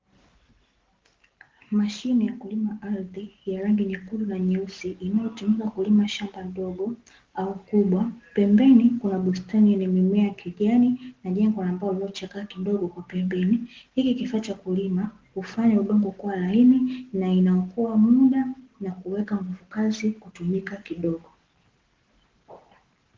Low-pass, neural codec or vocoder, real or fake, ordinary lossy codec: 7.2 kHz; none; real; Opus, 16 kbps